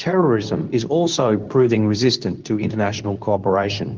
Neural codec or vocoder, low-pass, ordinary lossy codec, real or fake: codec, 24 kHz, 0.9 kbps, WavTokenizer, medium speech release version 2; 7.2 kHz; Opus, 24 kbps; fake